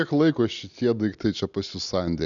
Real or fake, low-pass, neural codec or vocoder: real; 7.2 kHz; none